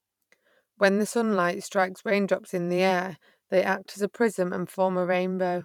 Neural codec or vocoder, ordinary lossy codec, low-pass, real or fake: vocoder, 44.1 kHz, 128 mel bands every 512 samples, BigVGAN v2; none; 19.8 kHz; fake